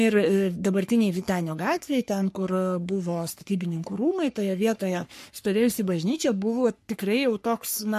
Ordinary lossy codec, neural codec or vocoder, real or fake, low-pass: MP3, 64 kbps; codec, 44.1 kHz, 3.4 kbps, Pupu-Codec; fake; 14.4 kHz